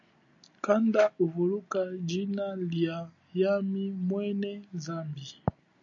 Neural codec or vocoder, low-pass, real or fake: none; 7.2 kHz; real